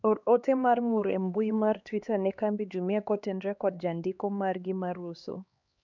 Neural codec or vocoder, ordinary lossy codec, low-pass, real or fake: codec, 16 kHz, 2 kbps, X-Codec, HuBERT features, trained on LibriSpeech; none; 7.2 kHz; fake